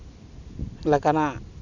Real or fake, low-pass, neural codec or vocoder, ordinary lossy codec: real; 7.2 kHz; none; none